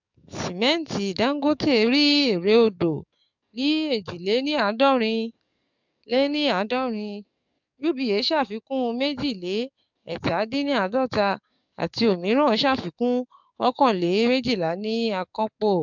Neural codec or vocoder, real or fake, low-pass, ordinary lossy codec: codec, 44.1 kHz, 7.8 kbps, DAC; fake; 7.2 kHz; MP3, 64 kbps